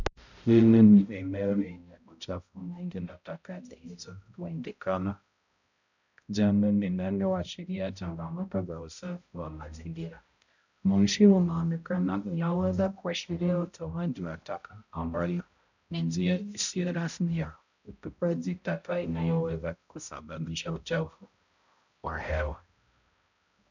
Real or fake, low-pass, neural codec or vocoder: fake; 7.2 kHz; codec, 16 kHz, 0.5 kbps, X-Codec, HuBERT features, trained on balanced general audio